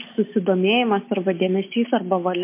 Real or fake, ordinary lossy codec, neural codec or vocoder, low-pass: real; MP3, 24 kbps; none; 3.6 kHz